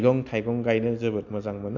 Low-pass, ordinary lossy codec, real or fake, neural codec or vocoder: 7.2 kHz; none; real; none